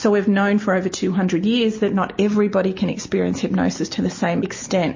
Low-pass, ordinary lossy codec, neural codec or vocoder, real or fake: 7.2 kHz; MP3, 32 kbps; none; real